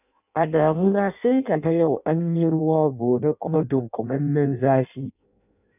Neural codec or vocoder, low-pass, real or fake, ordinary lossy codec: codec, 16 kHz in and 24 kHz out, 0.6 kbps, FireRedTTS-2 codec; 3.6 kHz; fake; none